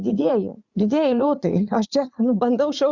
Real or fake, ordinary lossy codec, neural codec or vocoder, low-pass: fake; Opus, 64 kbps; vocoder, 44.1 kHz, 80 mel bands, Vocos; 7.2 kHz